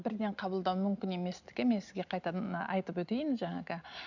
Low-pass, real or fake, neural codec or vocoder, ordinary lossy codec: 7.2 kHz; real; none; none